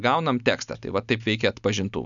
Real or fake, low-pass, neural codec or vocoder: real; 7.2 kHz; none